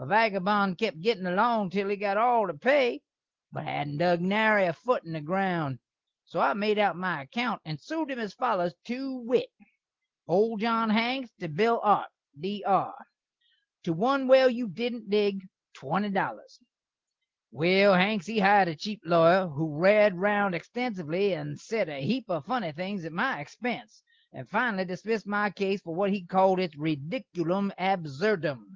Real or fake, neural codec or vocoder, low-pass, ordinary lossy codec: real; none; 7.2 kHz; Opus, 16 kbps